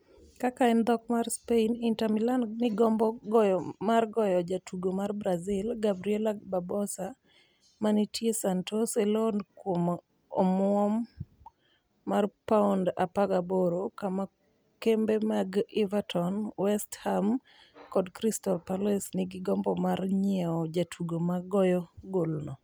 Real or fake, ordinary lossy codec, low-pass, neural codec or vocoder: real; none; none; none